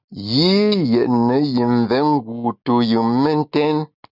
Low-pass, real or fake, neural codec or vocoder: 5.4 kHz; real; none